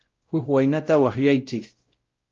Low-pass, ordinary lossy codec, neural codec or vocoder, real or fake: 7.2 kHz; Opus, 32 kbps; codec, 16 kHz, 0.5 kbps, X-Codec, WavLM features, trained on Multilingual LibriSpeech; fake